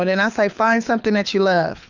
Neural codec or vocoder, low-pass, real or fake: codec, 24 kHz, 6 kbps, HILCodec; 7.2 kHz; fake